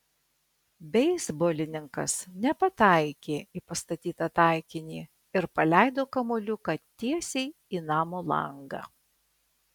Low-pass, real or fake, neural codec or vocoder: 19.8 kHz; real; none